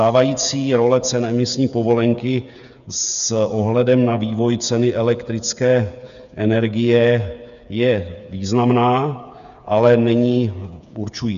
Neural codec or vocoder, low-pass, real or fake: codec, 16 kHz, 8 kbps, FreqCodec, smaller model; 7.2 kHz; fake